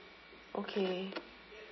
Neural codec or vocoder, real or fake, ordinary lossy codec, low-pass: none; real; MP3, 24 kbps; 7.2 kHz